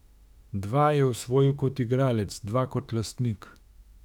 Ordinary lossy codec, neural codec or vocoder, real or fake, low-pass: none; autoencoder, 48 kHz, 32 numbers a frame, DAC-VAE, trained on Japanese speech; fake; 19.8 kHz